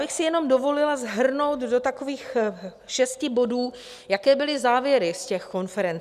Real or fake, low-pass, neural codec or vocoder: real; 14.4 kHz; none